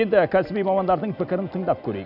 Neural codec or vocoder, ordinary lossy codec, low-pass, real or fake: none; none; 5.4 kHz; real